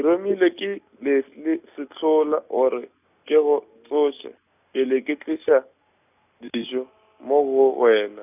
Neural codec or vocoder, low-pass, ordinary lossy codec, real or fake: none; 3.6 kHz; none; real